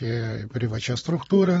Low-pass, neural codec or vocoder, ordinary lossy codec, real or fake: 19.8 kHz; vocoder, 44.1 kHz, 128 mel bands every 512 samples, BigVGAN v2; AAC, 24 kbps; fake